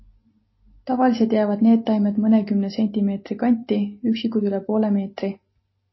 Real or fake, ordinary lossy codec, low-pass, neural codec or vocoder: real; MP3, 24 kbps; 7.2 kHz; none